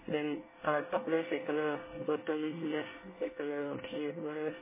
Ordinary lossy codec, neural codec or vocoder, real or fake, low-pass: MP3, 16 kbps; codec, 24 kHz, 1 kbps, SNAC; fake; 3.6 kHz